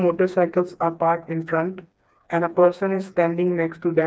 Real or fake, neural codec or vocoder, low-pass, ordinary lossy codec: fake; codec, 16 kHz, 2 kbps, FreqCodec, smaller model; none; none